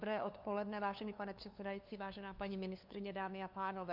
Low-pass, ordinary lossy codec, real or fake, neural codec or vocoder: 5.4 kHz; MP3, 48 kbps; fake; codec, 16 kHz, 2 kbps, FunCodec, trained on LibriTTS, 25 frames a second